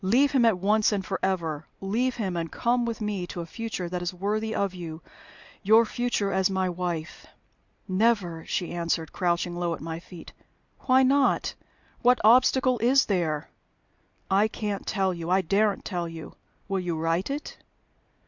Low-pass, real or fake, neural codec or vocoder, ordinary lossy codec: 7.2 kHz; real; none; Opus, 64 kbps